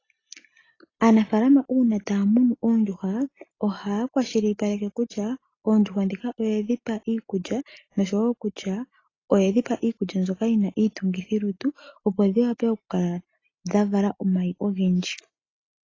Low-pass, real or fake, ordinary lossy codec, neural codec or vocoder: 7.2 kHz; real; AAC, 32 kbps; none